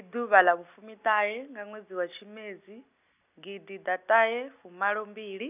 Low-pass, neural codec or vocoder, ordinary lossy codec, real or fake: 3.6 kHz; none; none; real